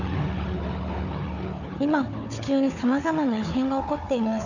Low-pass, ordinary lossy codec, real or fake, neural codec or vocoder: 7.2 kHz; none; fake; codec, 16 kHz, 4 kbps, FunCodec, trained on Chinese and English, 50 frames a second